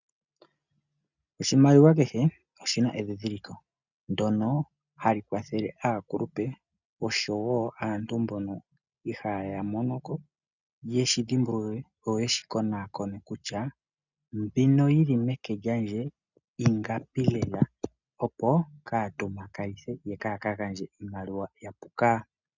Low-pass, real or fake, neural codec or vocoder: 7.2 kHz; real; none